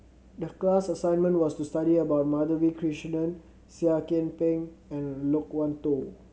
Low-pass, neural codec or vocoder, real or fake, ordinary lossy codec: none; none; real; none